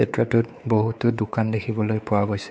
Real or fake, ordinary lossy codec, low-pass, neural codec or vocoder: fake; none; none; codec, 16 kHz, 4 kbps, X-Codec, WavLM features, trained on Multilingual LibriSpeech